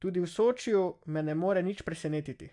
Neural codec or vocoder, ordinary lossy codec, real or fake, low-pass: none; AAC, 64 kbps; real; 10.8 kHz